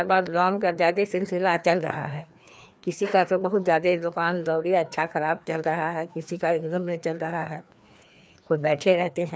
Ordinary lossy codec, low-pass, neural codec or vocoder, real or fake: none; none; codec, 16 kHz, 2 kbps, FreqCodec, larger model; fake